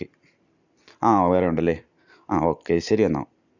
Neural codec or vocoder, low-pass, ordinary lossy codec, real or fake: none; 7.2 kHz; none; real